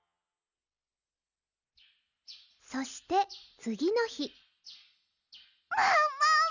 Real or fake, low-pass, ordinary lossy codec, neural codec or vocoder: real; 7.2 kHz; none; none